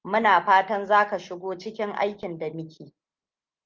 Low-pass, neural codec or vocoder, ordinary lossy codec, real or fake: 7.2 kHz; none; Opus, 32 kbps; real